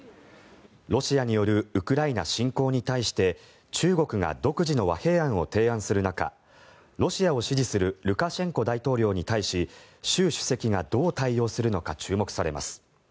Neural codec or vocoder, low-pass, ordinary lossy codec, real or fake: none; none; none; real